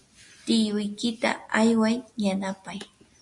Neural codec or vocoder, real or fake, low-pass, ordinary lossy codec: none; real; 10.8 kHz; MP3, 48 kbps